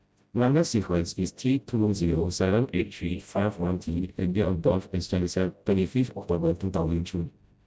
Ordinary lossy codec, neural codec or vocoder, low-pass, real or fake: none; codec, 16 kHz, 0.5 kbps, FreqCodec, smaller model; none; fake